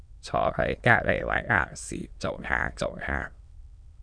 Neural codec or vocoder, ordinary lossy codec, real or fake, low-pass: autoencoder, 22.05 kHz, a latent of 192 numbers a frame, VITS, trained on many speakers; MP3, 96 kbps; fake; 9.9 kHz